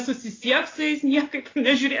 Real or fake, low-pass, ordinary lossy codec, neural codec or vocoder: real; 7.2 kHz; AAC, 32 kbps; none